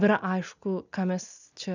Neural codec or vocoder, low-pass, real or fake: none; 7.2 kHz; real